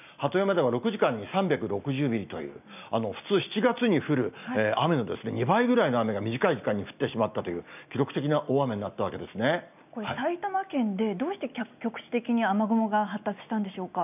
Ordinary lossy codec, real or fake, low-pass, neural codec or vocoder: none; real; 3.6 kHz; none